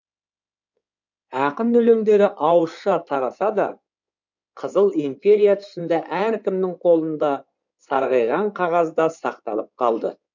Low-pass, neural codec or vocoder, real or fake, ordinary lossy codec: 7.2 kHz; codec, 16 kHz in and 24 kHz out, 2.2 kbps, FireRedTTS-2 codec; fake; none